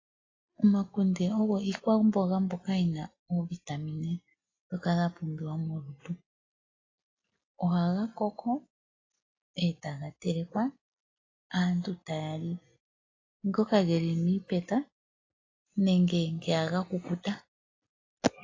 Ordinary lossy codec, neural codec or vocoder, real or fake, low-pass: AAC, 32 kbps; none; real; 7.2 kHz